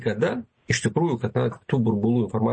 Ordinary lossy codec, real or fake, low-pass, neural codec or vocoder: MP3, 32 kbps; real; 9.9 kHz; none